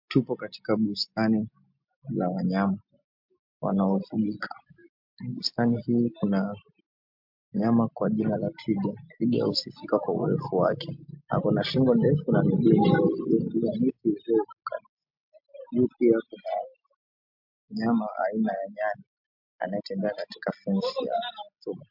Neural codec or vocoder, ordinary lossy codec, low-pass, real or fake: none; MP3, 48 kbps; 5.4 kHz; real